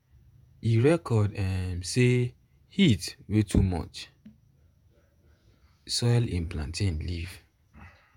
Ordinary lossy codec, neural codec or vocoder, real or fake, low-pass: none; vocoder, 48 kHz, 128 mel bands, Vocos; fake; 19.8 kHz